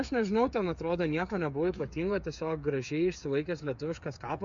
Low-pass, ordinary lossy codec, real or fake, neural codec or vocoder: 7.2 kHz; MP3, 64 kbps; fake; codec, 16 kHz, 8 kbps, FreqCodec, smaller model